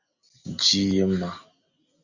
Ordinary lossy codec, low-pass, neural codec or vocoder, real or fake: Opus, 64 kbps; 7.2 kHz; none; real